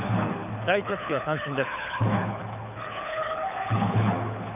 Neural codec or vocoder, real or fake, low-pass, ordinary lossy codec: codec, 24 kHz, 6 kbps, HILCodec; fake; 3.6 kHz; none